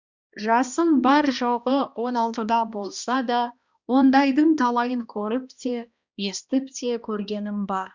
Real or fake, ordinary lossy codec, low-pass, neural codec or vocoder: fake; Opus, 64 kbps; 7.2 kHz; codec, 16 kHz, 1 kbps, X-Codec, HuBERT features, trained on balanced general audio